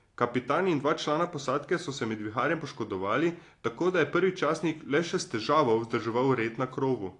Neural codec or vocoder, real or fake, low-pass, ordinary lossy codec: none; real; 10.8 kHz; AAC, 48 kbps